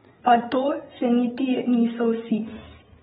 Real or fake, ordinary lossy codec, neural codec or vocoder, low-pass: fake; AAC, 16 kbps; codec, 16 kHz, 8 kbps, FreqCodec, larger model; 7.2 kHz